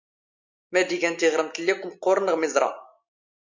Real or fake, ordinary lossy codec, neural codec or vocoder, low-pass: real; MP3, 64 kbps; none; 7.2 kHz